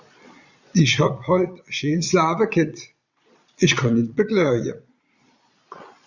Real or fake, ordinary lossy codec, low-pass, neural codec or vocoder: fake; Opus, 64 kbps; 7.2 kHz; vocoder, 22.05 kHz, 80 mel bands, Vocos